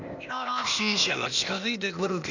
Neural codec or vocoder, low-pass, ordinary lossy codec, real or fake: codec, 16 kHz, 0.8 kbps, ZipCodec; 7.2 kHz; none; fake